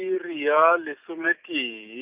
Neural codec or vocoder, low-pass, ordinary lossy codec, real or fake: none; 3.6 kHz; Opus, 24 kbps; real